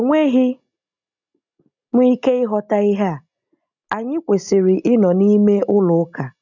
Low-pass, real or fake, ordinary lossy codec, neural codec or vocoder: 7.2 kHz; real; none; none